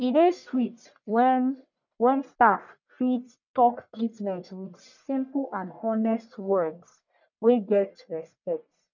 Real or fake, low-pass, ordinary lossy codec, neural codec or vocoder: fake; 7.2 kHz; none; codec, 44.1 kHz, 1.7 kbps, Pupu-Codec